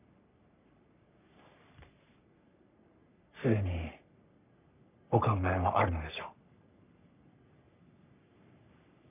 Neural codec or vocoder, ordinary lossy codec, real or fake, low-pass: codec, 44.1 kHz, 3.4 kbps, Pupu-Codec; none; fake; 3.6 kHz